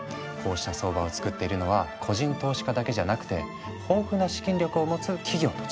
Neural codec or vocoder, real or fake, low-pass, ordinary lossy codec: none; real; none; none